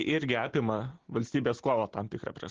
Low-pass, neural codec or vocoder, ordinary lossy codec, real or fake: 7.2 kHz; none; Opus, 16 kbps; real